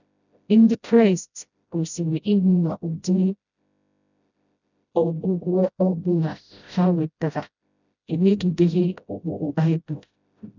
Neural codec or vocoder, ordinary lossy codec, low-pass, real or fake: codec, 16 kHz, 0.5 kbps, FreqCodec, smaller model; none; 7.2 kHz; fake